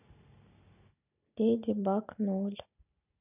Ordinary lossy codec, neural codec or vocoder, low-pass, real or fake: none; none; 3.6 kHz; real